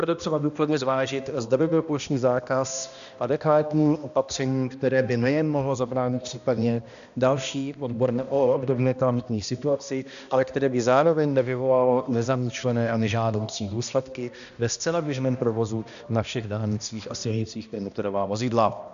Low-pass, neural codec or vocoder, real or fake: 7.2 kHz; codec, 16 kHz, 1 kbps, X-Codec, HuBERT features, trained on balanced general audio; fake